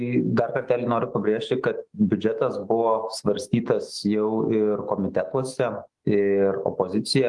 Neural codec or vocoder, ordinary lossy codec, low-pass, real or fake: autoencoder, 48 kHz, 128 numbers a frame, DAC-VAE, trained on Japanese speech; Opus, 24 kbps; 10.8 kHz; fake